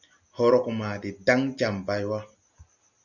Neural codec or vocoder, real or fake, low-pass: none; real; 7.2 kHz